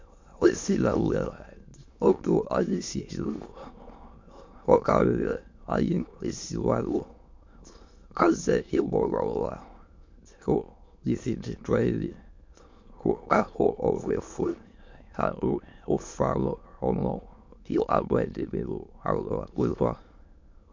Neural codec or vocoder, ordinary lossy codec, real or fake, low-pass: autoencoder, 22.05 kHz, a latent of 192 numbers a frame, VITS, trained on many speakers; MP3, 48 kbps; fake; 7.2 kHz